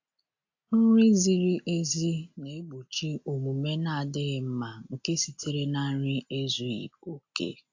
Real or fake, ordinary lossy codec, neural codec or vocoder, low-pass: real; AAC, 48 kbps; none; 7.2 kHz